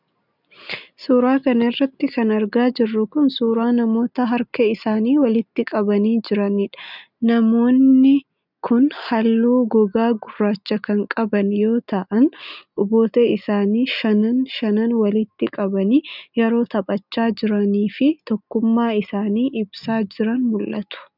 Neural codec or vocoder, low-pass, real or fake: none; 5.4 kHz; real